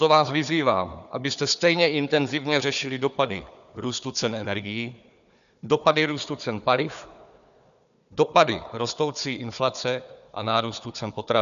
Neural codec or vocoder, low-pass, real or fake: codec, 16 kHz, 4 kbps, FunCodec, trained on Chinese and English, 50 frames a second; 7.2 kHz; fake